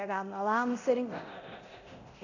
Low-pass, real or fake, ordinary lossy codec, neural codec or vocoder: 7.2 kHz; fake; none; codec, 24 kHz, 0.9 kbps, DualCodec